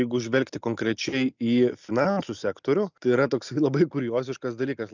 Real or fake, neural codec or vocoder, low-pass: real; none; 7.2 kHz